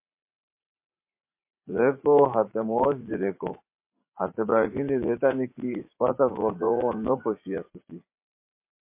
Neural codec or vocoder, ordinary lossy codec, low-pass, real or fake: vocoder, 44.1 kHz, 80 mel bands, Vocos; MP3, 24 kbps; 3.6 kHz; fake